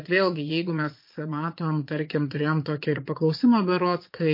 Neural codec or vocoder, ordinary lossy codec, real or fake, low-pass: vocoder, 22.05 kHz, 80 mel bands, Vocos; MP3, 32 kbps; fake; 5.4 kHz